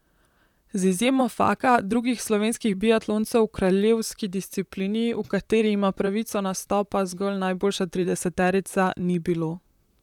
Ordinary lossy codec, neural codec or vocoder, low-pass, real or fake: none; vocoder, 44.1 kHz, 128 mel bands, Pupu-Vocoder; 19.8 kHz; fake